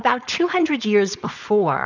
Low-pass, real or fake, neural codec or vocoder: 7.2 kHz; fake; codec, 16 kHz, 4.8 kbps, FACodec